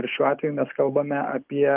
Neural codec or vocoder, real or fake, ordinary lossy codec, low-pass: none; real; Opus, 32 kbps; 3.6 kHz